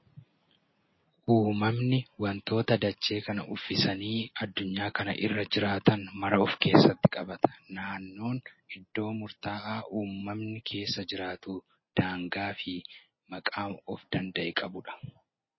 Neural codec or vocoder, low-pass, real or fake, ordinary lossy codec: none; 7.2 kHz; real; MP3, 24 kbps